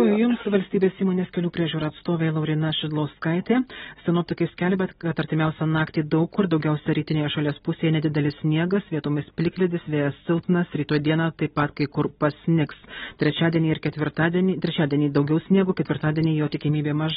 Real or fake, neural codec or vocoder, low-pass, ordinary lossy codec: real; none; 7.2 kHz; AAC, 16 kbps